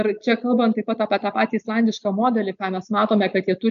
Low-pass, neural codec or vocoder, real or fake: 7.2 kHz; none; real